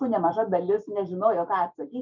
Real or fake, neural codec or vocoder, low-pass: real; none; 7.2 kHz